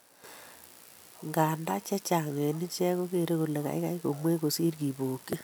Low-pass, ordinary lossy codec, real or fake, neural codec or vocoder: none; none; real; none